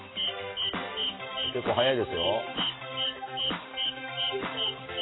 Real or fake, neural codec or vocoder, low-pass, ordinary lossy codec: real; none; 7.2 kHz; AAC, 16 kbps